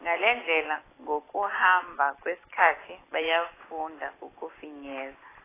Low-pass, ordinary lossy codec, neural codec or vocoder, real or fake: 3.6 kHz; AAC, 16 kbps; none; real